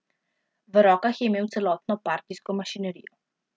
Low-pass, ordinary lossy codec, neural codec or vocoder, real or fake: none; none; none; real